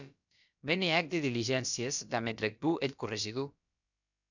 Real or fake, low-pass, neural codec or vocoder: fake; 7.2 kHz; codec, 16 kHz, about 1 kbps, DyCAST, with the encoder's durations